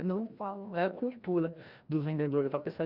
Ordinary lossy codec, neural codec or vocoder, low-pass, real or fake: Opus, 64 kbps; codec, 16 kHz, 1 kbps, FreqCodec, larger model; 5.4 kHz; fake